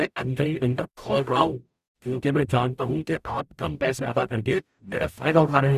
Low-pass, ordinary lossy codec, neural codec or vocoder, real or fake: 14.4 kHz; Opus, 64 kbps; codec, 44.1 kHz, 0.9 kbps, DAC; fake